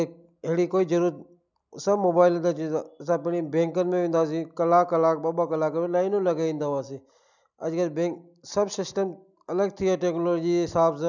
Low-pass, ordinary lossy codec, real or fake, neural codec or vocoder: 7.2 kHz; none; real; none